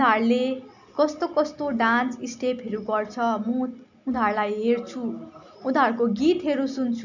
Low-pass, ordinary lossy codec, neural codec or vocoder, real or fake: 7.2 kHz; none; none; real